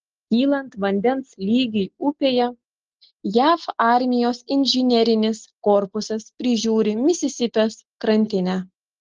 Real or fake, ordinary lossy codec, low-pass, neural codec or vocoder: real; Opus, 16 kbps; 7.2 kHz; none